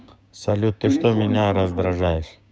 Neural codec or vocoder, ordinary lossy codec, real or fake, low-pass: codec, 16 kHz, 6 kbps, DAC; none; fake; none